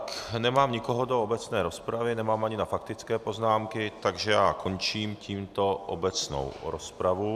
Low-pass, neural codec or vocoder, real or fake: 14.4 kHz; none; real